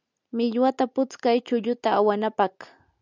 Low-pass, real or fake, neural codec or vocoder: 7.2 kHz; real; none